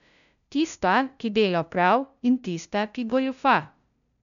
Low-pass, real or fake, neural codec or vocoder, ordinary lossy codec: 7.2 kHz; fake; codec, 16 kHz, 0.5 kbps, FunCodec, trained on LibriTTS, 25 frames a second; none